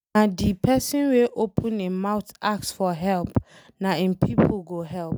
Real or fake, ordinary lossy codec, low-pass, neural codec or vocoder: real; none; none; none